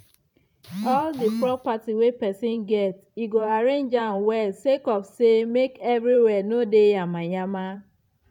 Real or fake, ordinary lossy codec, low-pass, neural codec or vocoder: fake; none; 19.8 kHz; vocoder, 44.1 kHz, 128 mel bands every 512 samples, BigVGAN v2